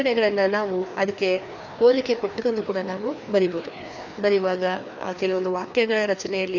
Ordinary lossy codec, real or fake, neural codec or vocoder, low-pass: none; fake; codec, 16 kHz, 2 kbps, FreqCodec, larger model; 7.2 kHz